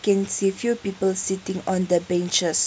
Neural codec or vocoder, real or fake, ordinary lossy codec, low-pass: none; real; none; none